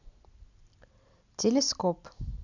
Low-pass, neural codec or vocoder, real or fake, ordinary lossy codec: 7.2 kHz; none; real; none